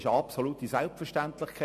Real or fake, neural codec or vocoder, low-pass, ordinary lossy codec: real; none; 14.4 kHz; MP3, 64 kbps